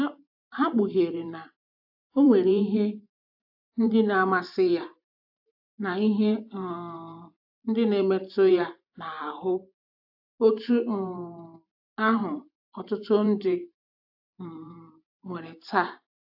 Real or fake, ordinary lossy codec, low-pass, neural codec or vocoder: fake; AAC, 48 kbps; 5.4 kHz; vocoder, 44.1 kHz, 128 mel bands every 512 samples, BigVGAN v2